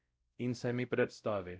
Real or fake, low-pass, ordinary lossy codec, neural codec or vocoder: fake; none; none; codec, 16 kHz, 0.5 kbps, X-Codec, WavLM features, trained on Multilingual LibriSpeech